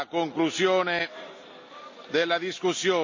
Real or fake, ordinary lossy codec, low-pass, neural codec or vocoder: real; MP3, 48 kbps; 7.2 kHz; none